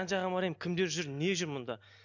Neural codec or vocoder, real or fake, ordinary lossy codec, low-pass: none; real; none; 7.2 kHz